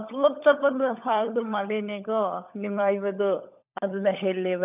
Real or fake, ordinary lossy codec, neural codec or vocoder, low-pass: fake; none; codec, 16 kHz, 16 kbps, FunCodec, trained on LibriTTS, 50 frames a second; 3.6 kHz